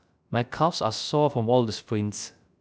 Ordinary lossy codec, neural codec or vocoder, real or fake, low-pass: none; codec, 16 kHz, 0.3 kbps, FocalCodec; fake; none